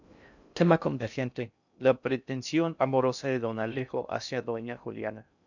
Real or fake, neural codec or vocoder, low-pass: fake; codec, 16 kHz in and 24 kHz out, 0.6 kbps, FocalCodec, streaming, 2048 codes; 7.2 kHz